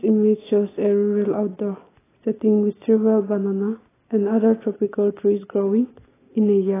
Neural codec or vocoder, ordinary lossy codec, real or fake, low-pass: none; AAC, 16 kbps; real; 3.6 kHz